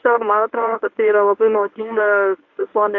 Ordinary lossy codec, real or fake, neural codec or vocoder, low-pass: AAC, 48 kbps; fake; codec, 24 kHz, 0.9 kbps, WavTokenizer, medium speech release version 1; 7.2 kHz